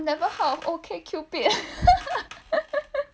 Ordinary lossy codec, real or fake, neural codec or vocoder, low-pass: none; real; none; none